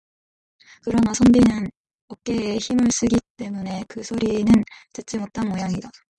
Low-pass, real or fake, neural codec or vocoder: 10.8 kHz; real; none